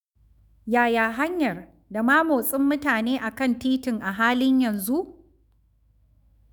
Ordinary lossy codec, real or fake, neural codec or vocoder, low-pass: none; fake; autoencoder, 48 kHz, 128 numbers a frame, DAC-VAE, trained on Japanese speech; none